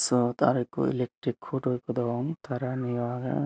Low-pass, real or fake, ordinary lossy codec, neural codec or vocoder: none; real; none; none